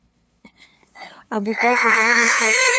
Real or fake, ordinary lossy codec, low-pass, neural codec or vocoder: fake; none; none; codec, 16 kHz, 2 kbps, FunCodec, trained on LibriTTS, 25 frames a second